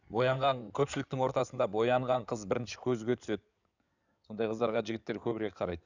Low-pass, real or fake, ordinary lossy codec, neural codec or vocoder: 7.2 kHz; fake; none; codec, 16 kHz in and 24 kHz out, 2.2 kbps, FireRedTTS-2 codec